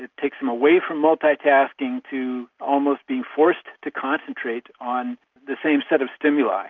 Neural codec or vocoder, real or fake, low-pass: none; real; 7.2 kHz